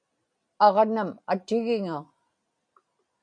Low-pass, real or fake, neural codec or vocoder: 9.9 kHz; real; none